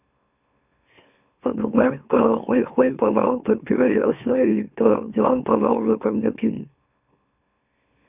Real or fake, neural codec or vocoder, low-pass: fake; autoencoder, 44.1 kHz, a latent of 192 numbers a frame, MeloTTS; 3.6 kHz